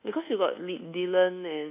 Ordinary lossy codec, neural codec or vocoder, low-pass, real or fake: none; codec, 24 kHz, 1.2 kbps, DualCodec; 3.6 kHz; fake